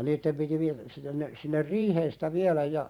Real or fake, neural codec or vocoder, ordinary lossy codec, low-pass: fake; vocoder, 44.1 kHz, 128 mel bands every 512 samples, BigVGAN v2; none; 19.8 kHz